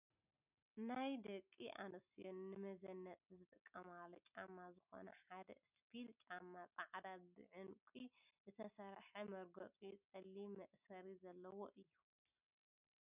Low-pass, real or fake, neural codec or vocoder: 3.6 kHz; real; none